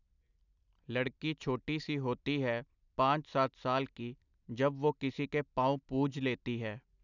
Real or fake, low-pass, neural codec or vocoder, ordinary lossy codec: real; 7.2 kHz; none; none